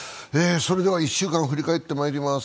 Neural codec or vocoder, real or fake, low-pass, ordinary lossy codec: none; real; none; none